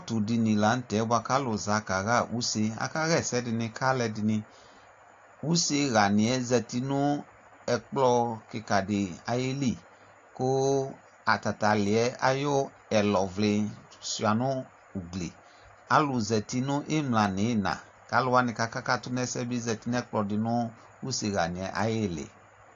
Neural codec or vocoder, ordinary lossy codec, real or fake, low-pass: none; AAC, 48 kbps; real; 7.2 kHz